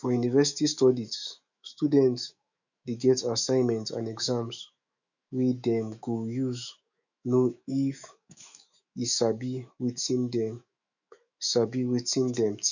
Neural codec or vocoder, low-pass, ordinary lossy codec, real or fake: autoencoder, 48 kHz, 128 numbers a frame, DAC-VAE, trained on Japanese speech; 7.2 kHz; none; fake